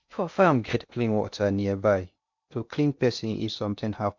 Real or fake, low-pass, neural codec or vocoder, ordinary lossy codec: fake; 7.2 kHz; codec, 16 kHz in and 24 kHz out, 0.6 kbps, FocalCodec, streaming, 2048 codes; MP3, 64 kbps